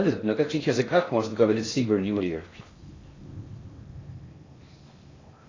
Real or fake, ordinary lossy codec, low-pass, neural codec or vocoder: fake; AAC, 32 kbps; 7.2 kHz; codec, 16 kHz in and 24 kHz out, 0.6 kbps, FocalCodec, streaming, 4096 codes